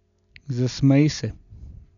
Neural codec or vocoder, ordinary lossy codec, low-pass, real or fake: none; none; 7.2 kHz; real